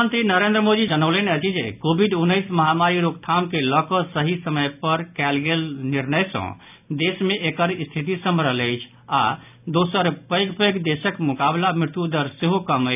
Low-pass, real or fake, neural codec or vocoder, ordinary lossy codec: 3.6 kHz; real; none; none